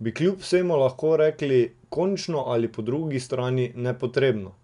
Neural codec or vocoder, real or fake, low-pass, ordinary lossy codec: none; real; 10.8 kHz; none